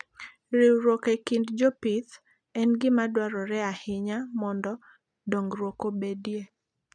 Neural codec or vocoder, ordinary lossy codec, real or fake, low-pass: none; none; real; 9.9 kHz